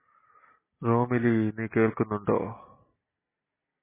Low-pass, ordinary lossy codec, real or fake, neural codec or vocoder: 3.6 kHz; MP3, 16 kbps; real; none